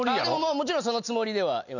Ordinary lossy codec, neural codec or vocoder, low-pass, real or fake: none; none; 7.2 kHz; real